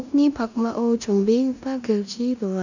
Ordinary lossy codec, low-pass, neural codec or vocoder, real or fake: none; 7.2 kHz; codec, 16 kHz in and 24 kHz out, 0.9 kbps, LongCat-Audio-Codec, four codebook decoder; fake